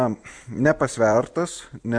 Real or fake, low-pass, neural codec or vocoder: real; 9.9 kHz; none